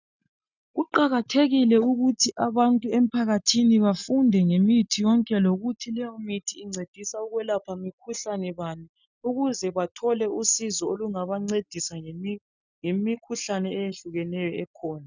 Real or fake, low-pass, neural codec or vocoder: real; 7.2 kHz; none